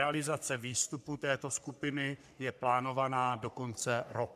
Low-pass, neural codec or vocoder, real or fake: 14.4 kHz; codec, 44.1 kHz, 3.4 kbps, Pupu-Codec; fake